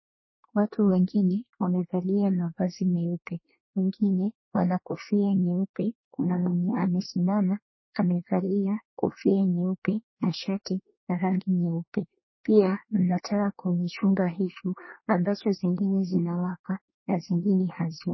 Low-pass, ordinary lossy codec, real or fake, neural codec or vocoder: 7.2 kHz; MP3, 24 kbps; fake; codec, 24 kHz, 1 kbps, SNAC